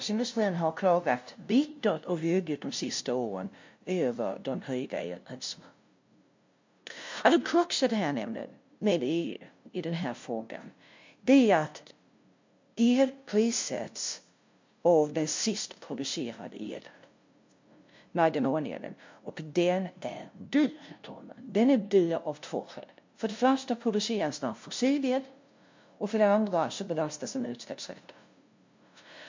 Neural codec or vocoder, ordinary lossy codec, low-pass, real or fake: codec, 16 kHz, 0.5 kbps, FunCodec, trained on LibriTTS, 25 frames a second; MP3, 48 kbps; 7.2 kHz; fake